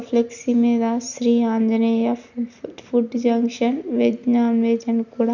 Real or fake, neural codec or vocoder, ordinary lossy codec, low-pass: real; none; none; 7.2 kHz